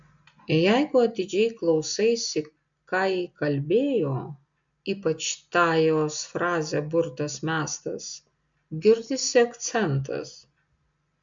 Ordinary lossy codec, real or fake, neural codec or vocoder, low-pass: MP3, 64 kbps; real; none; 7.2 kHz